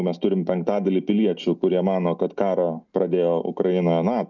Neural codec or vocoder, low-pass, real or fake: none; 7.2 kHz; real